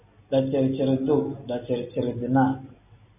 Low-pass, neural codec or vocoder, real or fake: 3.6 kHz; none; real